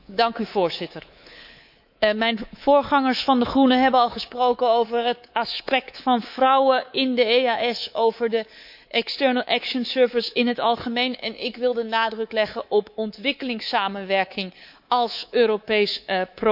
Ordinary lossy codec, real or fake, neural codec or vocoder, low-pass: none; fake; codec, 24 kHz, 3.1 kbps, DualCodec; 5.4 kHz